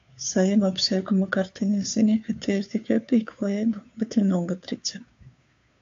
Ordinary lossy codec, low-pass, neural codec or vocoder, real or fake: AAC, 48 kbps; 7.2 kHz; codec, 16 kHz, 2 kbps, FunCodec, trained on Chinese and English, 25 frames a second; fake